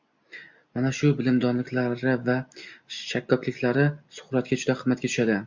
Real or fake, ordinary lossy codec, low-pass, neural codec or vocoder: real; MP3, 64 kbps; 7.2 kHz; none